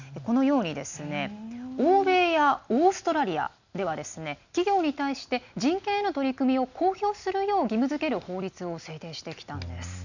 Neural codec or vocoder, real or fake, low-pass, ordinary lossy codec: none; real; 7.2 kHz; none